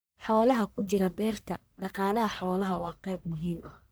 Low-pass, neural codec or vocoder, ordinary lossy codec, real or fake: none; codec, 44.1 kHz, 1.7 kbps, Pupu-Codec; none; fake